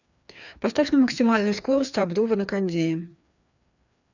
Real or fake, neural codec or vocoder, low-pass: fake; codec, 16 kHz, 2 kbps, FreqCodec, larger model; 7.2 kHz